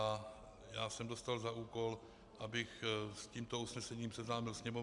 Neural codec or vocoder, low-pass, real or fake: none; 10.8 kHz; real